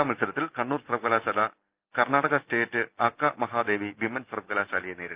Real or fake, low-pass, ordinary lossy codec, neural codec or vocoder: real; 3.6 kHz; Opus, 24 kbps; none